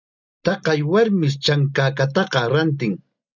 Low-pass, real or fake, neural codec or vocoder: 7.2 kHz; real; none